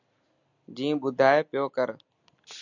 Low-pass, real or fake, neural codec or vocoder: 7.2 kHz; real; none